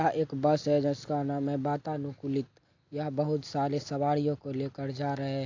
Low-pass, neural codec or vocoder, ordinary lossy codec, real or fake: 7.2 kHz; none; AAC, 48 kbps; real